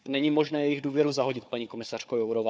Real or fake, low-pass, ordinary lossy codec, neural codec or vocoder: fake; none; none; codec, 16 kHz, 4 kbps, FunCodec, trained on Chinese and English, 50 frames a second